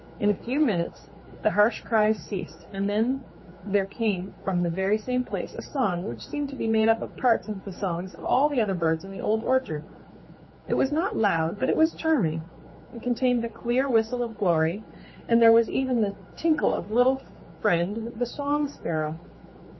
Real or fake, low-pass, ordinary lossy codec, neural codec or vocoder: fake; 7.2 kHz; MP3, 24 kbps; codec, 16 kHz, 4 kbps, X-Codec, HuBERT features, trained on general audio